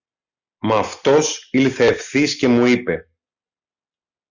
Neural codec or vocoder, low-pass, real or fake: none; 7.2 kHz; real